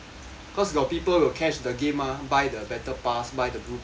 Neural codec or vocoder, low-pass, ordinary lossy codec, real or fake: none; none; none; real